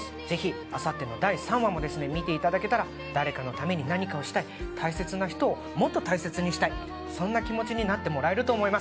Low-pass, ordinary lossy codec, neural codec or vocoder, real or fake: none; none; none; real